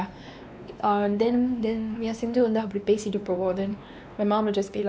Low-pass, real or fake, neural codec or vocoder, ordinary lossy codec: none; fake; codec, 16 kHz, 2 kbps, X-Codec, WavLM features, trained on Multilingual LibriSpeech; none